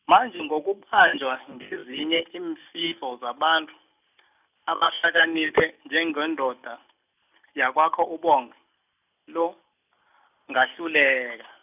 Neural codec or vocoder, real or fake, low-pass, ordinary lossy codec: none; real; 3.6 kHz; none